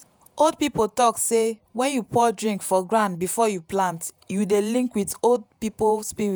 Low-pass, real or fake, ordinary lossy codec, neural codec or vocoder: none; fake; none; vocoder, 48 kHz, 128 mel bands, Vocos